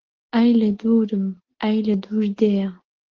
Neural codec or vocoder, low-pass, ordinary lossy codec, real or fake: none; 7.2 kHz; Opus, 16 kbps; real